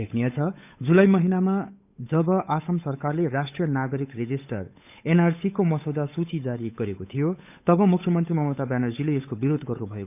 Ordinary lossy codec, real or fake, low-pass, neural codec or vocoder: none; fake; 3.6 kHz; codec, 16 kHz, 8 kbps, FunCodec, trained on Chinese and English, 25 frames a second